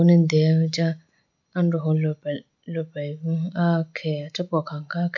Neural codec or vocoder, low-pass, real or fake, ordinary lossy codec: none; 7.2 kHz; real; none